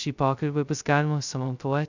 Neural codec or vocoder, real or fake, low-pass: codec, 16 kHz, 0.2 kbps, FocalCodec; fake; 7.2 kHz